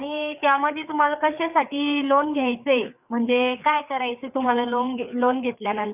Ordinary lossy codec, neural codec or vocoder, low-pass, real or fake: none; codec, 16 kHz, 4 kbps, FreqCodec, larger model; 3.6 kHz; fake